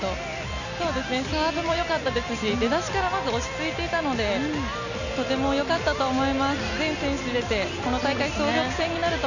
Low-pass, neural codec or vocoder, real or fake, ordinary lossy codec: 7.2 kHz; none; real; none